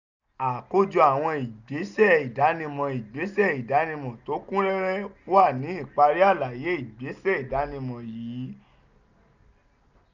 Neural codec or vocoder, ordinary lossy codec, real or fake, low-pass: none; none; real; 7.2 kHz